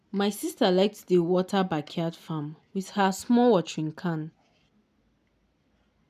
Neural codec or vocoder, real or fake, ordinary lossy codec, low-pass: none; real; none; 14.4 kHz